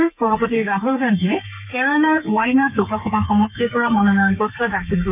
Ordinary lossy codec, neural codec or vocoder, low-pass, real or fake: none; codec, 32 kHz, 1.9 kbps, SNAC; 3.6 kHz; fake